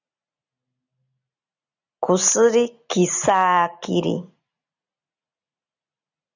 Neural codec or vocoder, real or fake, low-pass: none; real; 7.2 kHz